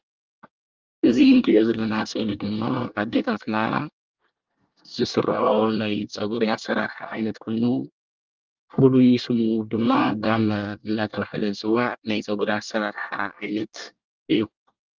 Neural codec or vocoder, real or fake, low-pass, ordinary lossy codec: codec, 24 kHz, 1 kbps, SNAC; fake; 7.2 kHz; Opus, 24 kbps